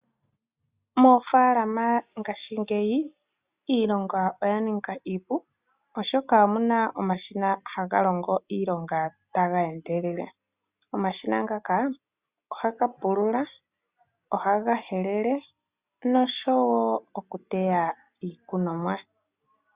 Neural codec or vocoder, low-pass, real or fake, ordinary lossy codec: none; 3.6 kHz; real; Opus, 64 kbps